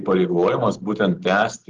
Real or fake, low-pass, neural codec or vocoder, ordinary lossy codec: real; 7.2 kHz; none; Opus, 16 kbps